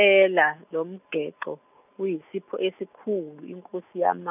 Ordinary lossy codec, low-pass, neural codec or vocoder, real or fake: none; 3.6 kHz; none; real